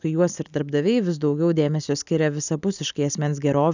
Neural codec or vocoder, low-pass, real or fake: none; 7.2 kHz; real